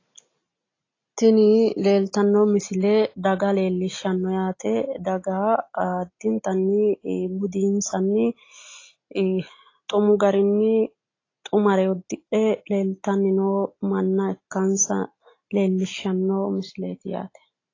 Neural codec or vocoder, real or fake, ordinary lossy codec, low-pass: none; real; AAC, 32 kbps; 7.2 kHz